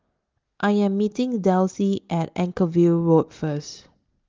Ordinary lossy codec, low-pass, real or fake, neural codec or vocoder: Opus, 32 kbps; 7.2 kHz; real; none